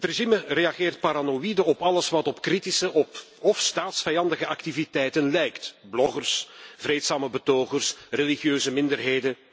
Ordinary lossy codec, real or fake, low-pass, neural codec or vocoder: none; real; none; none